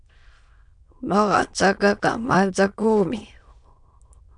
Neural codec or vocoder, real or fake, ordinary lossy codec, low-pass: autoencoder, 22.05 kHz, a latent of 192 numbers a frame, VITS, trained on many speakers; fake; MP3, 96 kbps; 9.9 kHz